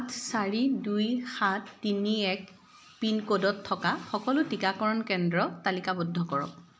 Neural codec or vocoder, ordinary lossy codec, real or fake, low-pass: none; none; real; none